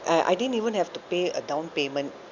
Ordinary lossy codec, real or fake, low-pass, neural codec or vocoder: Opus, 64 kbps; real; 7.2 kHz; none